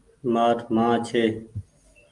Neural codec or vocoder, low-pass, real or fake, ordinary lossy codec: none; 10.8 kHz; real; Opus, 32 kbps